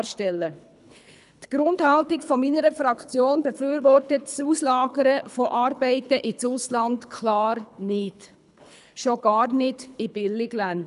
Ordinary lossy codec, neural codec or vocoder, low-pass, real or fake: none; codec, 24 kHz, 3 kbps, HILCodec; 10.8 kHz; fake